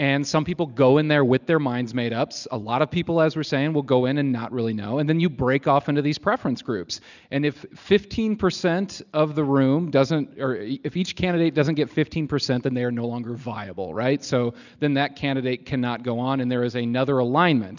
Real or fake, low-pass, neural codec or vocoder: real; 7.2 kHz; none